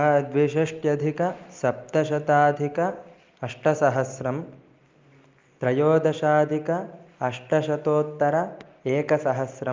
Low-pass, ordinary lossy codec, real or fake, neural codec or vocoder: 7.2 kHz; Opus, 32 kbps; real; none